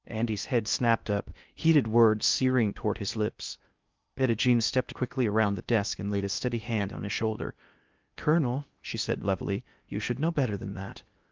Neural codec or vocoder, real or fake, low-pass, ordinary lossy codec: codec, 16 kHz in and 24 kHz out, 0.6 kbps, FocalCodec, streaming, 2048 codes; fake; 7.2 kHz; Opus, 32 kbps